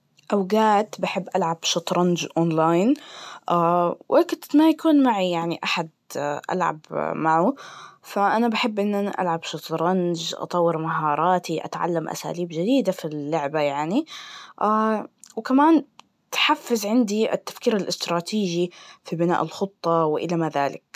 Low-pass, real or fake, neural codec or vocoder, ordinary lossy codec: 14.4 kHz; real; none; MP3, 96 kbps